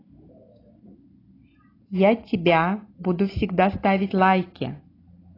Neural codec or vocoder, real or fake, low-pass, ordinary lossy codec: none; real; 5.4 kHz; AAC, 24 kbps